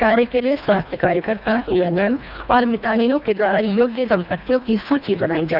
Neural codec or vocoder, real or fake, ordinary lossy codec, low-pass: codec, 24 kHz, 1.5 kbps, HILCodec; fake; none; 5.4 kHz